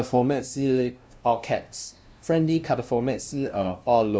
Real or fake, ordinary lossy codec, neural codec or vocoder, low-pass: fake; none; codec, 16 kHz, 0.5 kbps, FunCodec, trained on LibriTTS, 25 frames a second; none